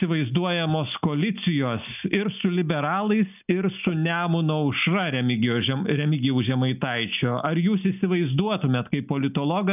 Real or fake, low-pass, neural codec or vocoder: real; 3.6 kHz; none